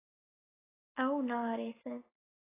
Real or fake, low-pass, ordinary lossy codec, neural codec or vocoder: real; 3.6 kHz; AAC, 16 kbps; none